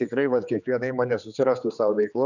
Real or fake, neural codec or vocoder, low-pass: fake; codec, 16 kHz, 4 kbps, X-Codec, HuBERT features, trained on general audio; 7.2 kHz